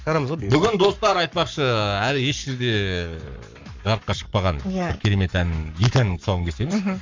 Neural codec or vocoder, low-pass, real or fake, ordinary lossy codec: codec, 44.1 kHz, 7.8 kbps, Pupu-Codec; 7.2 kHz; fake; MP3, 64 kbps